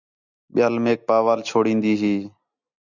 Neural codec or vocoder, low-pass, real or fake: none; 7.2 kHz; real